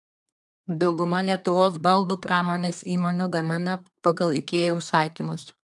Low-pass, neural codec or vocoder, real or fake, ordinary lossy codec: 10.8 kHz; codec, 24 kHz, 1 kbps, SNAC; fake; MP3, 96 kbps